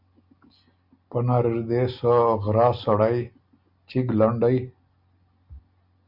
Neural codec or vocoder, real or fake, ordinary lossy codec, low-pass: none; real; Opus, 64 kbps; 5.4 kHz